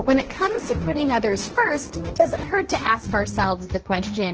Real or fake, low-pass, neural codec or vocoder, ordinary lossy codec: fake; 7.2 kHz; codec, 16 kHz, 1.1 kbps, Voila-Tokenizer; Opus, 16 kbps